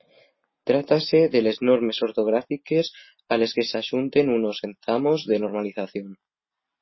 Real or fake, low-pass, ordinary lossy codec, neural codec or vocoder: real; 7.2 kHz; MP3, 24 kbps; none